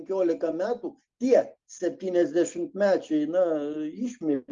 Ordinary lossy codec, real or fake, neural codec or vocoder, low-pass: Opus, 32 kbps; real; none; 7.2 kHz